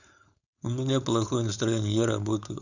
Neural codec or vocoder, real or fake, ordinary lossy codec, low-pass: codec, 16 kHz, 4.8 kbps, FACodec; fake; none; 7.2 kHz